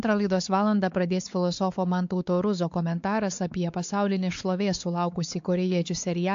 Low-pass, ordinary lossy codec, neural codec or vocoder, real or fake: 7.2 kHz; AAC, 64 kbps; codec, 16 kHz, 4 kbps, X-Codec, WavLM features, trained on Multilingual LibriSpeech; fake